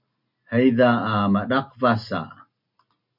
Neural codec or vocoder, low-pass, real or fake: none; 5.4 kHz; real